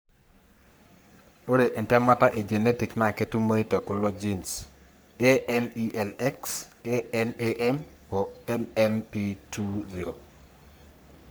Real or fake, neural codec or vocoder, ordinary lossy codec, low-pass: fake; codec, 44.1 kHz, 3.4 kbps, Pupu-Codec; none; none